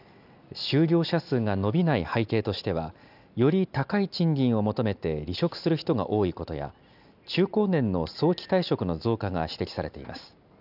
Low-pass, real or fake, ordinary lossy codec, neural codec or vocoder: 5.4 kHz; real; none; none